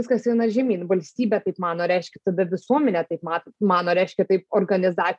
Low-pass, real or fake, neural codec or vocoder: 10.8 kHz; real; none